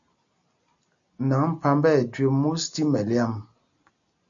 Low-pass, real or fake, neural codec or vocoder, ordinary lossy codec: 7.2 kHz; real; none; AAC, 64 kbps